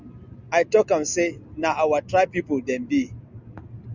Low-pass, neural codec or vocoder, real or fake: 7.2 kHz; none; real